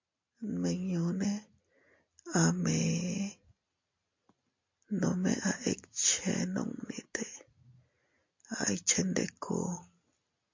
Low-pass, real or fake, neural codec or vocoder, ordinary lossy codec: 7.2 kHz; real; none; MP3, 48 kbps